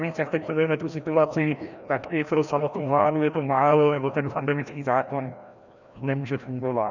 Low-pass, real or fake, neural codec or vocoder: 7.2 kHz; fake; codec, 16 kHz, 1 kbps, FreqCodec, larger model